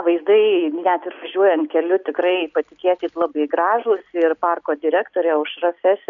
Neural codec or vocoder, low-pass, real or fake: none; 7.2 kHz; real